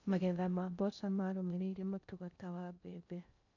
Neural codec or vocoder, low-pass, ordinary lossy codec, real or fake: codec, 16 kHz in and 24 kHz out, 0.6 kbps, FocalCodec, streaming, 2048 codes; 7.2 kHz; none; fake